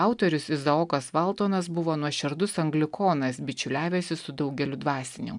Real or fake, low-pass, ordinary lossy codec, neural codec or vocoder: real; 10.8 kHz; MP3, 96 kbps; none